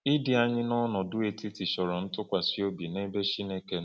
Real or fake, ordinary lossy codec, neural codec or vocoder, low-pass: real; none; none; none